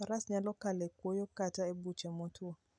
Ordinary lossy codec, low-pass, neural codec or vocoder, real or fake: none; 9.9 kHz; none; real